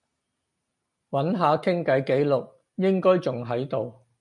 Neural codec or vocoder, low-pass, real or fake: none; 10.8 kHz; real